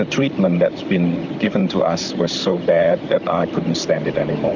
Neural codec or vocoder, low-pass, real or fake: vocoder, 44.1 kHz, 128 mel bands, Pupu-Vocoder; 7.2 kHz; fake